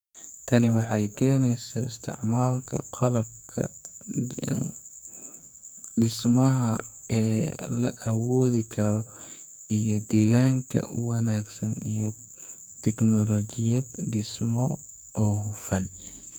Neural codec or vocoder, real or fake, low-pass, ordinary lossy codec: codec, 44.1 kHz, 2.6 kbps, SNAC; fake; none; none